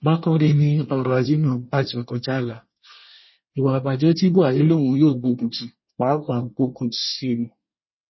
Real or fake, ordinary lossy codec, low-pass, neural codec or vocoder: fake; MP3, 24 kbps; 7.2 kHz; codec, 24 kHz, 1 kbps, SNAC